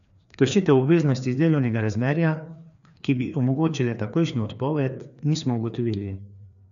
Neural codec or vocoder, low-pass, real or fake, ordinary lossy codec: codec, 16 kHz, 2 kbps, FreqCodec, larger model; 7.2 kHz; fake; none